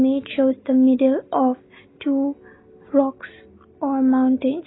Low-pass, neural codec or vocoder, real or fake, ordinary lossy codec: 7.2 kHz; none; real; AAC, 16 kbps